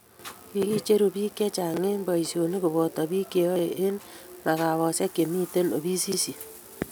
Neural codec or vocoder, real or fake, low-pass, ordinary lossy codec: none; real; none; none